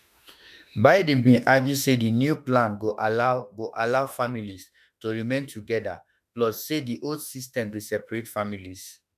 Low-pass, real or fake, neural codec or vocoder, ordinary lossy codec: 14.4 kHz; fake; autoencoder, 48 kHz, 32 numbers a frame, DAC-VAE, trained on Japanese speech; none